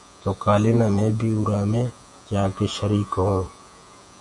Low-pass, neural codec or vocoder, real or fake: 10.8 kHz; vocoder, 48 kHz, 128 mel bands, Vocos; fake